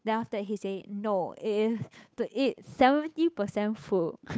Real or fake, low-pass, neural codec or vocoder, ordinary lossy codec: fake; none; codec, 16 kHz, 8 kbps, FunCodec, trained on Chinese and English, 25 frames a second; none